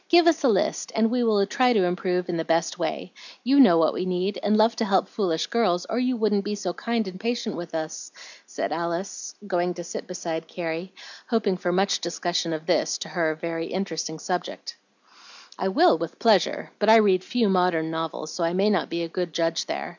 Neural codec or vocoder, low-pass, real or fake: none; 7.2 kHz; real